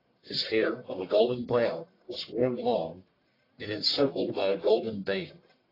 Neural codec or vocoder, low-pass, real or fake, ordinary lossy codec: codec, 44.1 kHz, 1.7 kbps, Pupu-Codec; 5.4 kHz; fake; AAC, 24 kbps